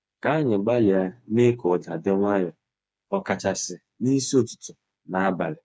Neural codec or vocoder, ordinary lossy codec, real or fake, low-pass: codec, 16 kHz, 4 kbps, FreqCodec, smaller model; none; fake; none